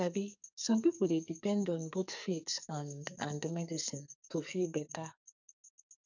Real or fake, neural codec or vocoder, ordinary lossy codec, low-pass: fake; codec, 44.1 kHz, 2.6 kbps, SNAC; none; 7.2 kHz